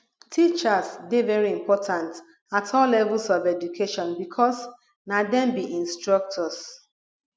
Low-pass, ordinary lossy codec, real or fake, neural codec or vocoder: none; none; real; none